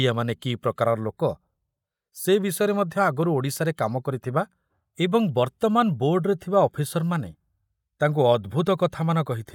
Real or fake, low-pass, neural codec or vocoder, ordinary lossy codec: real; 19.8 kHz; none; none